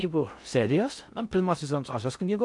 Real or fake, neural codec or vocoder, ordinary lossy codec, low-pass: fake; codec, 16 kHz in and 24 kHz out, 0.6 kbps, FocalCodec, streaming, 2048 codes; AAC, 64 kbps; 10.8 kHz